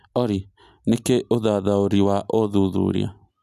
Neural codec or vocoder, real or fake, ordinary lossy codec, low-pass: none; real; none; 14.4 kHz